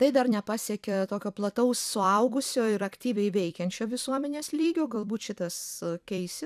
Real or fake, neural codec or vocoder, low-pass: fake; vocoder, 44.1 kHz, 128 mel bands every 256 samples, BigVGAN v2; 14.4 kHz